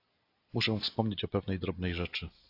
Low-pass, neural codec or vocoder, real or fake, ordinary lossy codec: 5.4 kHz; none; real; AAC, 32 kbps